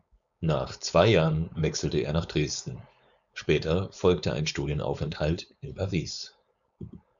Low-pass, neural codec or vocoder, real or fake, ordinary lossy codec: 7.2 kHz; codec, 16 kHz, 4.8 kbps, FACodec; fake; MP3, 96 kbps